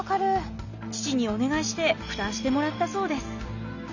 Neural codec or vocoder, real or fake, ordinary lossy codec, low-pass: none; real; none; 7.2 kHz